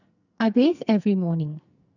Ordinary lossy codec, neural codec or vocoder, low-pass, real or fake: none; codec, 44.1 kHz, 2.6 kbps, SNAC; 7.2 kHz; fake